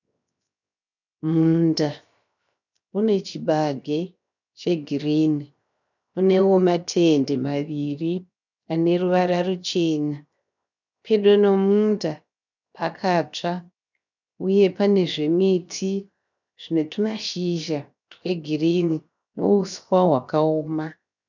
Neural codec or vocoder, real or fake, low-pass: codec, 16 kHz, 0.7 kbps, FocalCodec; fake; 7.2 kHz